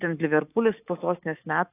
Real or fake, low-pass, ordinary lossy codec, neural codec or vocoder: fake; 3.6 kHz; AAC, 24 kbps; autoencoder, 48 kHz, 128 numbers a frame, DAC-VAE, trained on Japanese speech